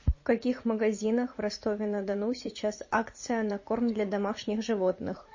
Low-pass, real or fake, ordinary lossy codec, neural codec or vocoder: 7.2 kHz; fake; MP3, 32 kbps; vocoder, 44.1 kHz, 80 mel bands, Vocos